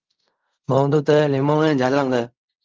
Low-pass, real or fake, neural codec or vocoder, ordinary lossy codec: 7.2 kHz; fake; codec, 16 kHz in and 24 kHz out, 0.4 kbps, LongCat-Audio-Codec, fine tuned four codebook decoder; Opus, 32 kbps